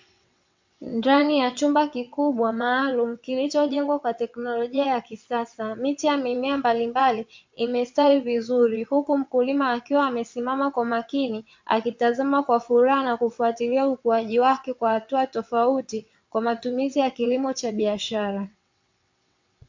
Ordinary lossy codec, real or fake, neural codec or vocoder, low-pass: MP3, 64 kbps; fake; vocoder, 22.05 kHz, 80 mel bands, WaveNeXt; 7.2 kHz